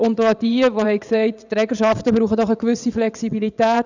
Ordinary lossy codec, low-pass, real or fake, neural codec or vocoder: none; 7.2 kHz; fake; vocoder, 22.05 kHz, 80 mel bands, WaveNeXt